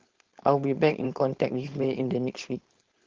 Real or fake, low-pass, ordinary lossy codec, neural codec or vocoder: fake; 7.2 kHz; Opus, 16 kbps; codec, 16 kHz, 4.8 kbps, FACodec